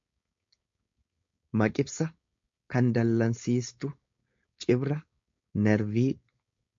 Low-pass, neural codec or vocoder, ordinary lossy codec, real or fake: 7.2 kHz; codec, 16 kHz, 4.8 kbps, FACodec; MP3, 48 kbps; fake